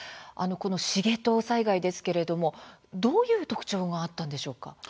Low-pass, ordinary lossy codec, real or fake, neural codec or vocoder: none; none; real; none